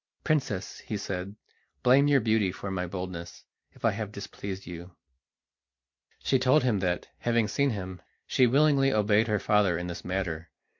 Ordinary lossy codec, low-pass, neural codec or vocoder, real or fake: MP3, 48 kbps; 7.2 kHz; none; real